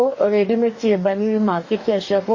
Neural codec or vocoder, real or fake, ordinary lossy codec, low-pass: codec, 44.1 kHz, 2.6 kbps, DAC; fake; MP3, 32 kbps; 7.2 kHz